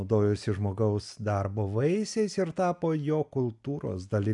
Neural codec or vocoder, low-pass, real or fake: none; 10.8 kHz; real